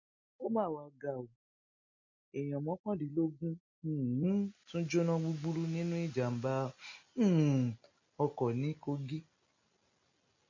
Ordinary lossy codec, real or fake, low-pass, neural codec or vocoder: MP3, 32 kbps; real; 7.2 kHz; none